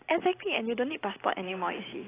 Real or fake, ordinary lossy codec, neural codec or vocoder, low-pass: real; AAC, 16 kbps; none; 3.6 kHz